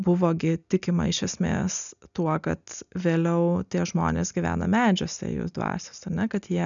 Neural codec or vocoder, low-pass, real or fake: none; 7.2 kHz; real